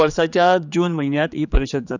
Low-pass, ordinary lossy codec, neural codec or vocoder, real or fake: 7.2 kHz; none; codec, 16 kHz, 4 kbps, X-Codec, HuBERT features, trained on general audio; fake